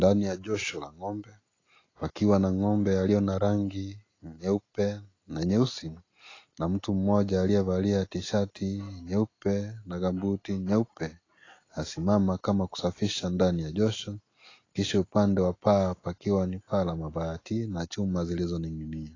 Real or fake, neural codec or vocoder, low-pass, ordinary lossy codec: real; none; 7.2 kHz; AAC, 32 kbps